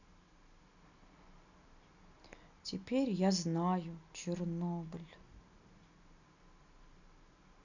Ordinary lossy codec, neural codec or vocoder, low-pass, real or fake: none; none; 7.2 kHz; real